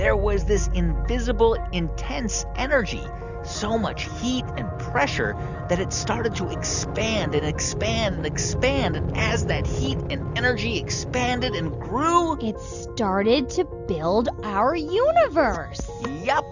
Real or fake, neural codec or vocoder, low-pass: real; none; 7.2 kHz